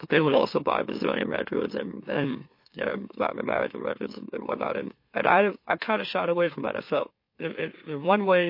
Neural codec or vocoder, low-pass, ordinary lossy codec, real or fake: autoencoder, 44.1 kHz, a latent of 192 numbers a frame, MeloTTS; 5.4 kHz; MP3, 32 kbps; fake